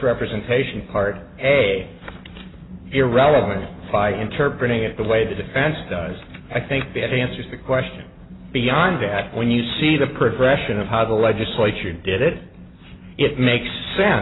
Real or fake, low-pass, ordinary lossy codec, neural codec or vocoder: real; 7.2 kHz; AAC, 16 kbps; none